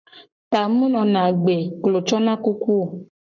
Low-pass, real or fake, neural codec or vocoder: 7.2 kHz; fake; codec, 44.1 kHz, 7.8 kbps, Pupu-Codec